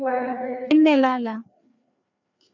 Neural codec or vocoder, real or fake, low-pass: codec, 16 kHz, 2 kbps, FreqCodec, larger model; fake; 7.2 kHz